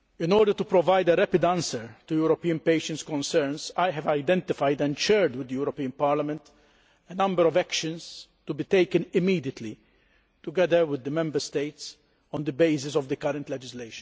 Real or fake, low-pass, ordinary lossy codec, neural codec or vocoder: real; none; none; none